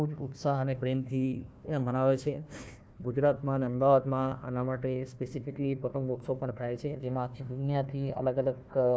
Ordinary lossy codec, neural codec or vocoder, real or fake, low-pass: none; codec, 16 kHz, 1 kbps, FunCodec, trained on Chinese and English, 50 frames a second; fake; none